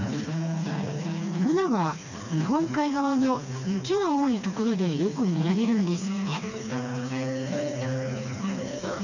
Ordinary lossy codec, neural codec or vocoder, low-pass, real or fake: none; codec, 16 kHz, 2 kbps, FreqCodec, smaller model; 7.2 kHz; fake